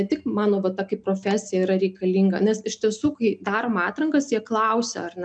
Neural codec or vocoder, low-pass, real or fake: none; 9.9 kHz; real